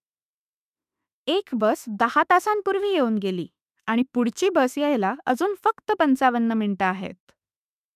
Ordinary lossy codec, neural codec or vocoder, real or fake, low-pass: none; autoencoder, 48 kHz, 32 numbers a frame, DAC-VAE, trained on Japanese speech; fake; 14.4 kHz